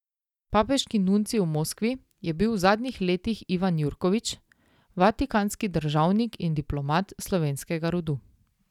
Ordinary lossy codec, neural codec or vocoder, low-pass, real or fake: none; none; 19.8 kHz; real